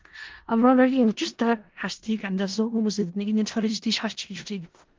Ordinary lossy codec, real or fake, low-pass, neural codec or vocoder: Opus, 16 kbps; fake; 7.2 kHz; codec, 16 kHz in and 24 kHz out, 0.4 kbps, LongCat-Audio-Codec, four codebook decoder